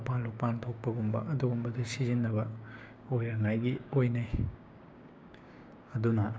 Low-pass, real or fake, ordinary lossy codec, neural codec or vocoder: none; fake; none; codec, 16 kHz, 6 kbps, DAC